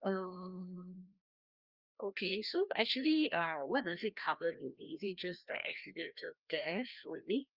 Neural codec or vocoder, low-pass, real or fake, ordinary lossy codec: codec, 16 kHz, 1 kbps, FreqCodec, larger model; 5.4 kHz; fake; Opus, 32 kbps